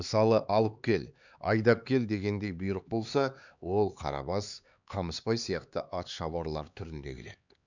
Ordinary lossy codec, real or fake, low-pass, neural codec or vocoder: none; fake; 7.2 kHz; codec, 16 kHz, 4 kbps, X-Codec, HuBERT features, trained on LibriSpeech